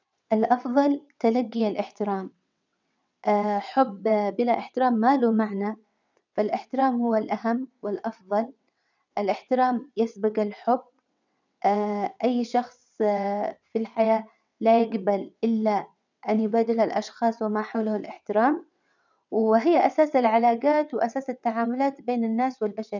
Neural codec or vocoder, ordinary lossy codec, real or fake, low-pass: vocoder, 22.05 kHz, 80 mel bands, WaveNeXt; none; fake; 7.2 kHz